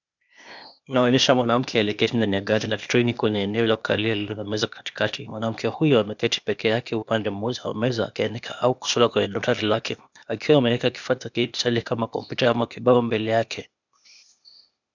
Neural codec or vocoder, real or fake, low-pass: codec, 16 kHz, 0.8 kbps, ZipCodec; fake; 7.2 kHz